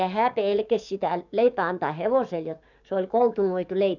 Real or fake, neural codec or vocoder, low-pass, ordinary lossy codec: fake; codec, 44.1 kHz, 7.8 kbps, DAC; 7.2 kHz; none